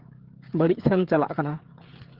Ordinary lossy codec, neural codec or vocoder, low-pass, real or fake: Opus, 16 kbps; codec, 16 kHz, 16 kbps, FreqCodec, smaller model; 5.4 kHz; fake